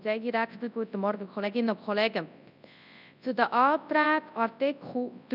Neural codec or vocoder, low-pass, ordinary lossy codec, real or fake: codec, 24 kHz, 0.9 kbps, WavTokenizer, large speech release; 5.4 kHz; none; fake